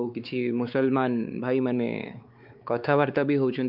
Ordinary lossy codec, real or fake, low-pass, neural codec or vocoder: Opus, 24 kbps; fake; 5.4 kHz; codec, 16 kHz, 4 kbps, X-Codec, WavLM features, trained on Multilingual LibriSpeech